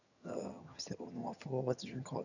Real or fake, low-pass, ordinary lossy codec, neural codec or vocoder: fake; 7.2 kHz; none; vocoder, 22.05 kHz, 80 mel bands, HiFi-GAN